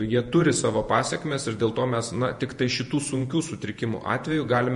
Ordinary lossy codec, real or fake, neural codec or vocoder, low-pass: MP3, 48 kbps; fake; vocoder, 48 kHz, 128 mel bands, Vocos; 14.4 kHz